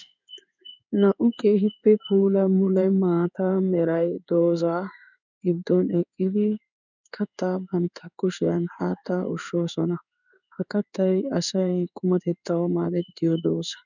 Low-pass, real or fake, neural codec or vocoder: 7.2 kHz; fake; codec, 16 kHz in and 24 kHz out, 1 kbps, XY-Tokenizer